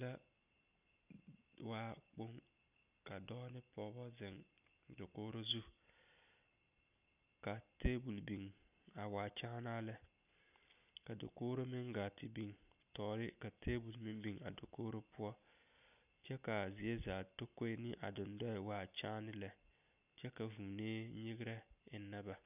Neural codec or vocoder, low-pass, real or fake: none; 3.6 kHz; real